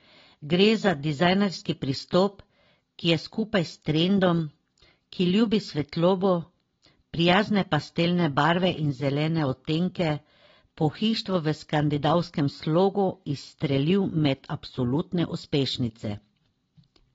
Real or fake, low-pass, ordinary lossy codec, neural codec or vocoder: real; 7.2 kHz; AAC, 24 kbps; none